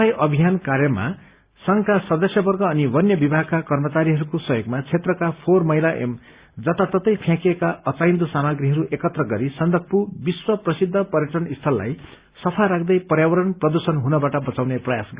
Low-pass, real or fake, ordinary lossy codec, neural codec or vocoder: 3.6 kHz; real; Opus, 64 kbps; none